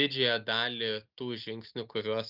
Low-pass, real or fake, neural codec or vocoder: 5.4 kHz; real; none